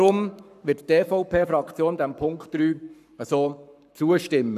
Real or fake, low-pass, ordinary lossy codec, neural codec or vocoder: fake; 14.4 kHz; none; codec, 44.1 kHz, 7.8 kbps, Pupu-Codec